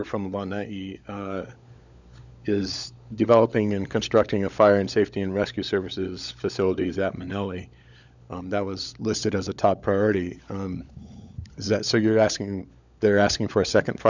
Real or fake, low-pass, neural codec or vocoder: fake; 7.2 kHz; codec, 16 kHz, 16 kbps, FunCodec, trained on LibriTTS, 50 frames a second